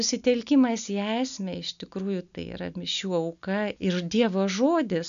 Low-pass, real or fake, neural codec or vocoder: 7.2 kHz; real; none